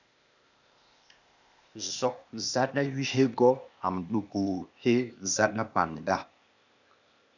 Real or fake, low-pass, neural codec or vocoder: fake; 7.2 kHz; codec, 16 kHz, 0.8 kbps, ZipCodec